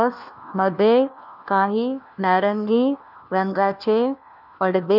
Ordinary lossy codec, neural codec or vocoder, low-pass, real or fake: none; codec, 16 kHz, 1 kbps, FunCodec, trained on LibriTTS, 50 frames a second; 5.4 kHz; fake